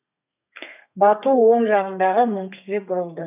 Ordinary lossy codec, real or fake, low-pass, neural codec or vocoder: none; fake; 3.6 kHz; codec, 32 kHz, 1.9 kbps, SNAC